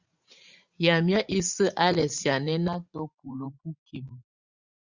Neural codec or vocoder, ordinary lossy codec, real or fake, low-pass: vocoder, 44.1 kHz, 80 mel bands, Vocos; Opus, 64 kbps; fake; 7.2 kHz